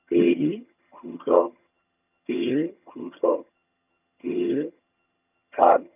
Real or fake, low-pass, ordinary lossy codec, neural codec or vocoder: fake; 3.6 kHz; none; vocoder, 22.05 kHz, 80 mel bands, HiFi-GAN